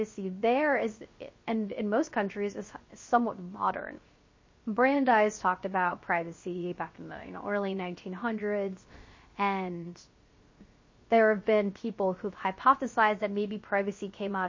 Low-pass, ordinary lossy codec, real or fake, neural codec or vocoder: 7.2 kHz; MP3, 32 kbps; fake; codec, 16 kHz, 0.3 kbps, FocalCodec